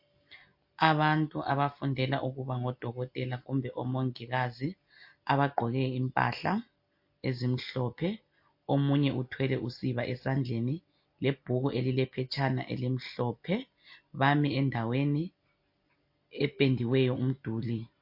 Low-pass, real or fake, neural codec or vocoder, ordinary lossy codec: 5.4 kHz; real; none; MP3, 32 kbps